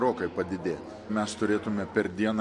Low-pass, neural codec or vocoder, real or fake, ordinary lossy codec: 10.8 kHz; none; real; MP3, 48 kbps